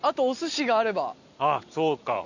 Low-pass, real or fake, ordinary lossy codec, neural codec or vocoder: 7.2 kHz; real; none; none